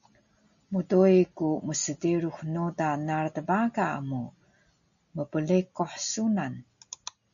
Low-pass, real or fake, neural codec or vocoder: 7.2 kHz; real; none